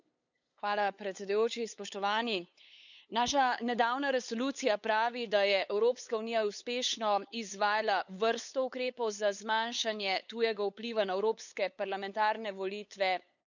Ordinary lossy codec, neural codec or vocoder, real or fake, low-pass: none; codec, 16 kHz, 8 kbps, FunCodec, trained on LibriTTS, 25 frames a second; fake; 7.2 kHz